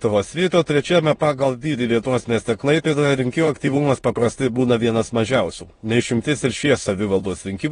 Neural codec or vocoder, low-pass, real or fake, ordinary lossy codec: autoencoder, 22.05 kHz, a latent of 192 numbers a frame, VITS, trained on many speakers; 9.9 kHz; fake; AAC, 32 kbps